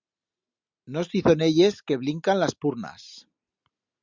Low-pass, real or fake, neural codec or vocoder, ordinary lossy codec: 7.2 kHz; real; none; Opus, 64 kbps